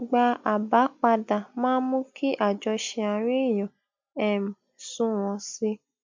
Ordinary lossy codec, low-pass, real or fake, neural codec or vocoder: MP3, 64 kbps; 7.2 kHz; real; none